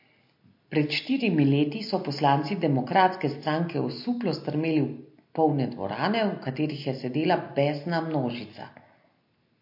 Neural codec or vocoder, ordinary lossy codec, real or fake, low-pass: none; MP3, 32 kbps; real; 5.4 kHz